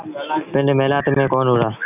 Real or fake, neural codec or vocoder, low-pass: real; none; 3.6 kHz